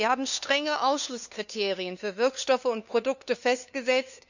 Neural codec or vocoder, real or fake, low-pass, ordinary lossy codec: codec, 16 kHz, 2 kbps, FunCodec, trained on LibriTTS, 25 frames a second; fake; 7.2 kHz; none